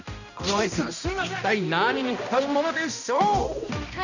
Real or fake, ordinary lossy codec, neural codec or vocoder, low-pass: fake; none; codec, 16 kHz, 1 kbps, X-Codec, HuBERT features, trained on balanced general audio; 7.2 kHz